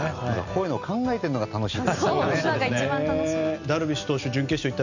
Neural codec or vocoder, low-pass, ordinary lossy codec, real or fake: none; 7.2 kHz; none; real